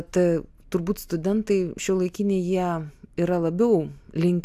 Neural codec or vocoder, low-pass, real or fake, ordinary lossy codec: none; 14.4 kHz; real; AAC, 96 kbps